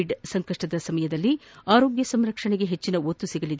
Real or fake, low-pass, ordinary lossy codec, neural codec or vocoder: real; none; none; none